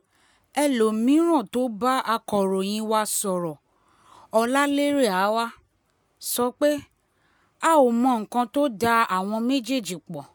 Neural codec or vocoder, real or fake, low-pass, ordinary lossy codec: none; real; none; none